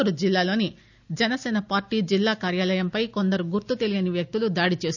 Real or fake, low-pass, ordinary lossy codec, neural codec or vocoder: real; 7.2 kHz; none; none